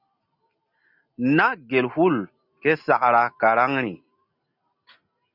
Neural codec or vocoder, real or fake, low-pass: none; real; 5.4 kHz